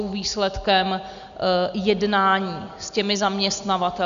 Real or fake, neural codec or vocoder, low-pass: real; none; 7.2 kHz